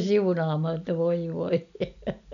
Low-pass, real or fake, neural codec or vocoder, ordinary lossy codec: 7.2 kHz; real; none; none